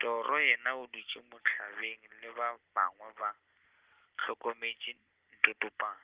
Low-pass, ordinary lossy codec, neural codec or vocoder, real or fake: 3.6 kHz; Opus, 16 kbps; none; real